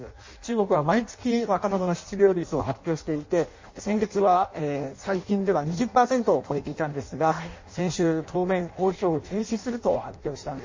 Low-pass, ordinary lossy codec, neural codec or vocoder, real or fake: 7.2 kHz; MP3, 32 kbps; codec, 16 kHz in and 24 kHz out, 0.6 kbps, FireRedTTS-2 codec; fake